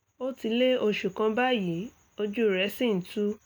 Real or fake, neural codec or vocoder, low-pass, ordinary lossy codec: real; none; none; none